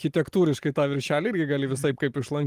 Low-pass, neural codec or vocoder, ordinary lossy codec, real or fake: 14.4 kHz; none; Opus, 32 kbps; real